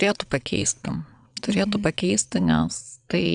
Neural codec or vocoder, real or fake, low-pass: vocoder, 22.05 kHz, 80 mel bands, WaveNeXt; fake; 9.9 kHz